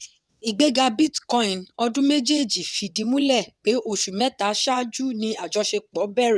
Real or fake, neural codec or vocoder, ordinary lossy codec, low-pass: fake; vocoder, 22.05 kHz, 80 mel bands, WaveNeXt; none; none